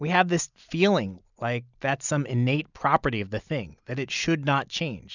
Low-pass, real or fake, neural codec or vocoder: 7.2 kHz; real; none